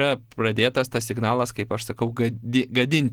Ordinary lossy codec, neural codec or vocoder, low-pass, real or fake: Opus, 16 kbps; none; 19.8 kHz; real